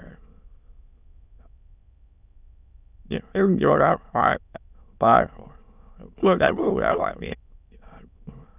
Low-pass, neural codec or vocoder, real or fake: 3.6 kHz; autoencoder, 22.05 kHz, a latent of 192 numbers a frame, VITS, trained on many speakers; fake